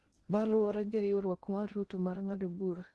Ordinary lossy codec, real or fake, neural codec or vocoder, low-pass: Opus, 16 kbps; fake; codec, 16 kHz in and 24 kHz out, 0.6 kbps, FocalCodec, streaming, 2048 codes; 10.8 kHz